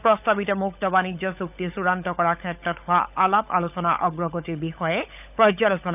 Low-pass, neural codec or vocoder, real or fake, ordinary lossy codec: 3.6 kHz; codec, 16 kHz, 16 kbps, FunCodec, trained on LibriTTS, 50 frames a second; fake; none